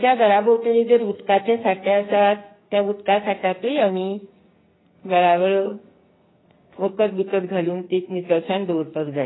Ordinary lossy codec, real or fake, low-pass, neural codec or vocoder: AAC, 16 kbps; fake; 7.2 kHz; codec, 44.1 kHz, 2.6 kbps, SNAC